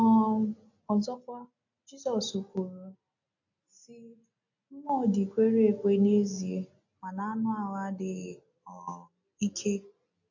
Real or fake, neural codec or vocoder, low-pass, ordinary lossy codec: real; none; 7.2 kHz; AAC, 48 kbps